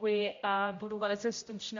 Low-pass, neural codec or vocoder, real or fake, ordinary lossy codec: 7.2 kHz; codec, 16 kHz, 0.5 kbps, X-Codec, HuBERT features, trained on general audio; fake; AAC, 48 kbps